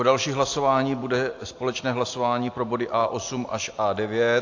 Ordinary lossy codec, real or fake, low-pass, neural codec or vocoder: AAC, 48 kbps; real; 7.2 kHz; none